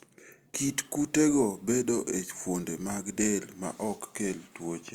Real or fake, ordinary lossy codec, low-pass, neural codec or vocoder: real; Opus, 32 kbps; 19.8 kHz; none